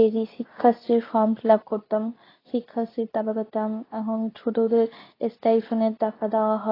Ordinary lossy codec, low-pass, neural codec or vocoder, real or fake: AAC, 24 kbps; 5.4 kHz; codec, 24 kHz, 0.9 kbps, WavTokenizer, medium speech release version 2; fake